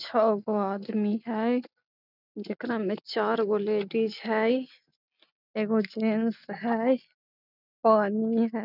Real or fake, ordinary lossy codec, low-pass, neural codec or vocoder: real; none; 5.4 kHz; none